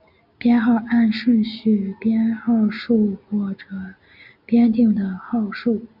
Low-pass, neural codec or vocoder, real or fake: 5.4 kHz; none; real